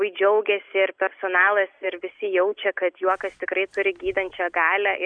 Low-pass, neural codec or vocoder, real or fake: 9.9 kHz; none; real